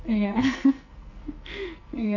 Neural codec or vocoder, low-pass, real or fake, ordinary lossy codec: codec, 44.1 kHz, 2.6 kbps, SNAC; 7.2 kHz; fake; none